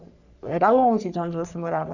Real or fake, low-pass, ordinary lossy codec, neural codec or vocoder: fake; 7.2 kHz; none; codec, 44.1 kHz, 3.4 kbps, Pupu-Codec